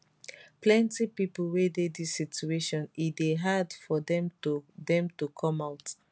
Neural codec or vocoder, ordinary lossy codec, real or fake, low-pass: none; none; real; none